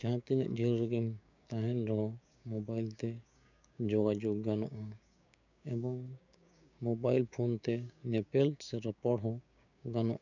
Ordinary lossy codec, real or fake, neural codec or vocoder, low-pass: none; fake; codec, 16 kHz, 6 kbps, DAC; 7.2 kHz